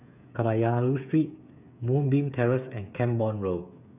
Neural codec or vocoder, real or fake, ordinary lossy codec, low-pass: codec, 16 kHz, 8 kbps, FreqCodec, smaller model; fake; none; 3.6 kHz